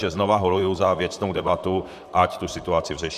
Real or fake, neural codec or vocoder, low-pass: fake; vocoder, 44.1 kHz, 128 mel bands, Pupu-Vocoder; 14.4 kHz